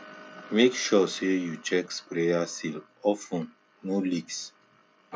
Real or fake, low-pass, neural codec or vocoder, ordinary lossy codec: real; none; none; none